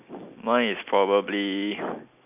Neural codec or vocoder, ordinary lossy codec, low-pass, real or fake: none; none; 3.6 kHz; real